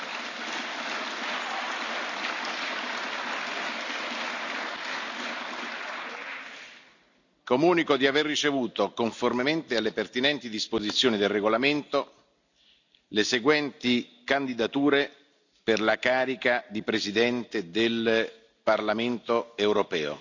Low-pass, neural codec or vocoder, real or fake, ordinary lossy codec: 7.2 kHz; none; real; none